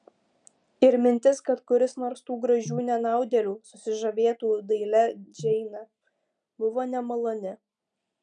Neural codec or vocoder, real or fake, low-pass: none; real; 9.9 kHz